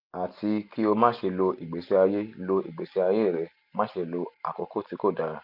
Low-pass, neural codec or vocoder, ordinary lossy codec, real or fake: 5.4 kHz; none; none; real